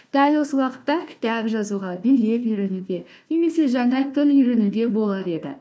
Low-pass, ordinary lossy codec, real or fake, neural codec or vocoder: none; none; fake; codec, 16 kHz, 1 kbps, FunCodec, trained on Chinese and English, 50 frames a second